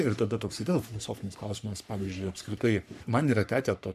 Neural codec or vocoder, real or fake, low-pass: codec, 44.1 kHz, 3.4 kbps, Pupu-Codec; fake; 14.4 kHz